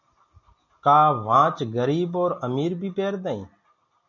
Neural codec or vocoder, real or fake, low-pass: none; real; 7.2 kHz